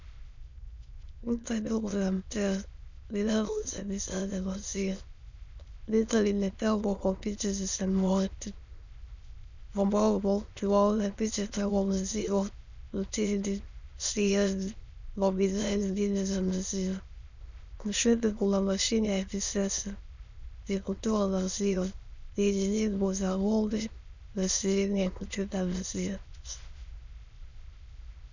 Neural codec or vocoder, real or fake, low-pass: autoencoder, 22.05 kHz, a latent of 192 numbers a frame, VITS, trained on many speakers; fake; 7.2 kHz